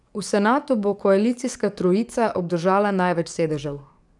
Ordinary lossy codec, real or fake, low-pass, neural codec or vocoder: none; fake; 10.8 kHz; codec, 44.1 kHz, 7.8 kbps, DAC